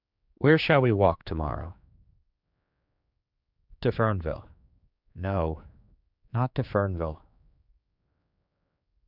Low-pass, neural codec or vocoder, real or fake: 5.4 kHz; codec, 16 kHz, 4 kbps, X-Codec, HuBERT features, trained on general audio; fake